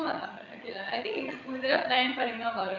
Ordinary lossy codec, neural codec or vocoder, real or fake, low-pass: MP3, 48 kbps; vocoder, 22.05 kHz, 80 mel bands, HiFi-GAN; fake; 7.2 kHz